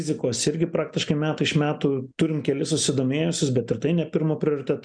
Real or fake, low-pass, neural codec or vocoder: real; 9.9 kHz; none